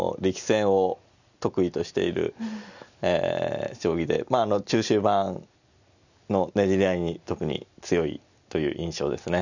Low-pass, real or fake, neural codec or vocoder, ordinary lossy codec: 7.2 kHz; real; none; none